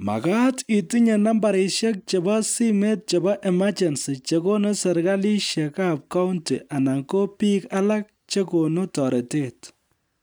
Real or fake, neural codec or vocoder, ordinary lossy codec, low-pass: real; none; none; none